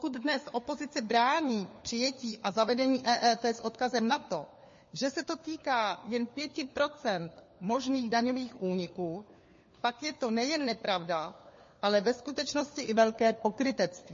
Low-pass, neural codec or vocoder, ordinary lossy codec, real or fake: 7.2 kHz; codec, 16 kHz, 4 kbps, FunCodec, trained on LibriTTS, 50 frames a second; MP3, 32 kbps; fake